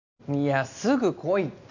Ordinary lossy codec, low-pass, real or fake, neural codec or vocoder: none; 7.2 kHz; real; none